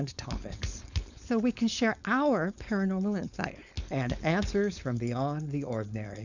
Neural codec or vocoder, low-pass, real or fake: codec, 16 kHz, 4.8 kbps, FACodec; 7.2 kHz; fake